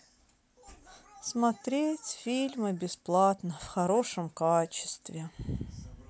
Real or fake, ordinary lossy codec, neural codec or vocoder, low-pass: real; none; none; none